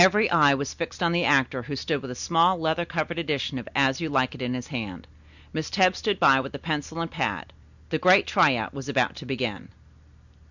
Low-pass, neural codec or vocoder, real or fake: 7.2 kHz; none; real